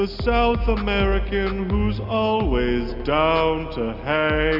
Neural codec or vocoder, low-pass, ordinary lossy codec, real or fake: none; 5.4 kHz; AAC, 48 kbps; real